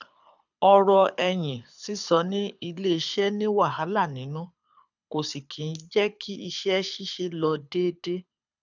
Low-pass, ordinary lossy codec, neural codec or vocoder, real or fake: 7.2 kHz; none; codec, 24 kHz, 6 kbps, HILCodec; fake